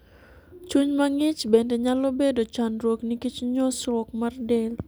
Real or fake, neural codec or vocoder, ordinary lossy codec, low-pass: real; none; none; none